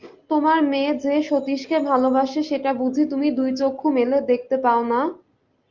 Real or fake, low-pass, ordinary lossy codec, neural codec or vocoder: real; 7.2 kHz; Opus, 24 kbps; none